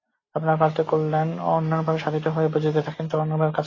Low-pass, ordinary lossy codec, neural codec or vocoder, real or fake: 7.2 kHz; AAC, 32 kbps; none; real